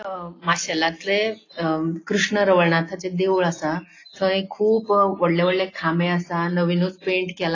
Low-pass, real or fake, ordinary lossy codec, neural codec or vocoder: 7.2 kHz; real; AAC, 32 kbps; none